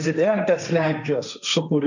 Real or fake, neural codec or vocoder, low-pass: fake; codec, 16 kHz, 1.1 kbps, Voila-Tokenizer; 7.2 kHz